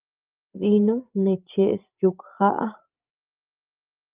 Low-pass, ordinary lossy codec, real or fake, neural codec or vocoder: 3.6 kHz; Opus, 24 kbps; fake; codec, 16 kHz, 16 kbps, FreqCodec, larger model